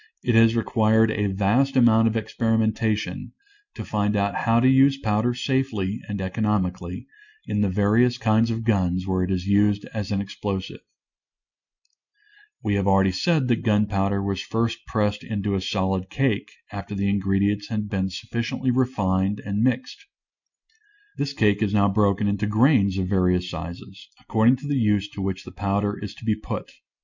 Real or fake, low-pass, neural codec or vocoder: real; 7.2 kHz; none